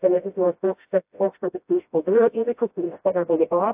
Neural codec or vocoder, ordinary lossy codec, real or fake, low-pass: codec, 16 kHz, 0.5 kbps, FreqCodec, smaller model; AAC, 32 kbps; fake; 3.6 kHz